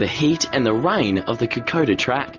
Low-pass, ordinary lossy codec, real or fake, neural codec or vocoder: 7.2 kHz; Opus, 32 kbps; real; none